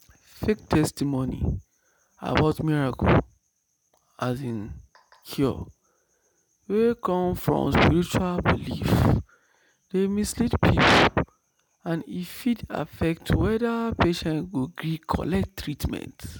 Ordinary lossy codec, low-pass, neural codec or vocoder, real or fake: none; none; none; real